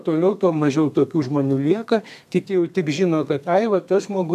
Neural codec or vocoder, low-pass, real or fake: codec, 32 kHz, 1.9 kbps, SNAC; 14.4 kHz; fake